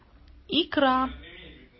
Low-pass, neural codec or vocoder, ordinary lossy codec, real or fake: 7.2 kHz; none; MP3, 24 kbps; real